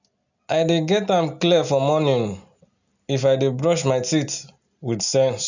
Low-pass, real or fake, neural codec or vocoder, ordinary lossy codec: 7.2 kHz; real; none; none